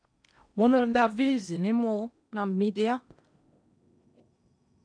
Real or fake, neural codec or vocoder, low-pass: fake; codec, 16 kHz in and 24 kHz out, 0.8 kbps, FocalCodec, streaming, 65536 codes; 9.9 kHz